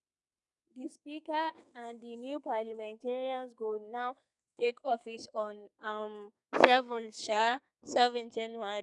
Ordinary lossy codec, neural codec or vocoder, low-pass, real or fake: none; codec, 32 kHz, 1.9 kbps, SNAC; 10.8 kHz; fake